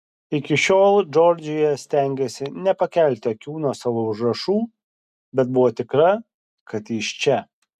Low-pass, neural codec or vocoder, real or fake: 14.4 kHz; none; real